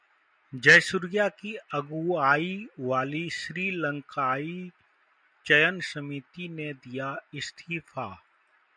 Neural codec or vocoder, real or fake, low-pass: none; real; 9.9 kHz